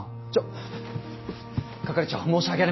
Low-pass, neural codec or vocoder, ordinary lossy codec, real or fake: 7.2 kHz; none; MP3, 24 kbps; real